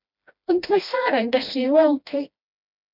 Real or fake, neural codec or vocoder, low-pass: fake; codec, 16 kHz, 1 kbps, FreqCodec, smaller model; 5.4 kHz